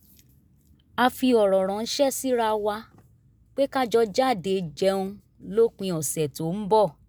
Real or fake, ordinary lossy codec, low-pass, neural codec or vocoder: real; none; none; none